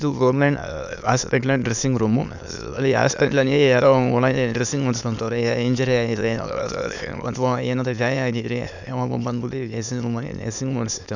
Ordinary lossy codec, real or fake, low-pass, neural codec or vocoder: none; fake; 7.2 kHz; autoencoder, 22.05 kHz, a latent of 192 numbers a frame, VITS, trained on many speakers